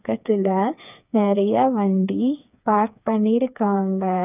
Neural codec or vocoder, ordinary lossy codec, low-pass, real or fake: codec, 16 kHz, 4 kbps, FreqCodec, smaller model; none; 3.6 kHz; fake